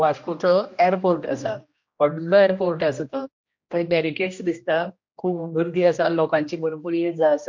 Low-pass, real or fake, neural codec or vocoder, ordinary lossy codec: 7.2 kHz; fake; codec, 16 kHz, 1 kbps, X-Codec, HuBERT features, trained on general audio; MP3, 48 kbps